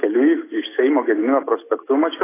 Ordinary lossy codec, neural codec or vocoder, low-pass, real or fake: AAC, 16 kbps; none; 3.6 kHz; real